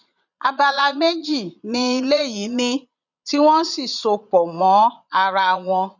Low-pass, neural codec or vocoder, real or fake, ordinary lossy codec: 7.2 kHz; vocoder, 22.05 kHz, 80 mel bands, Vocos; fake; none